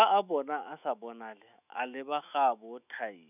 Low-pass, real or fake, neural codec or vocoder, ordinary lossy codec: 3.6 kHz; fake; autoencoder, 48 kHz, 128 numbers a frame, DAC-VAE, trained on Japanese speech; none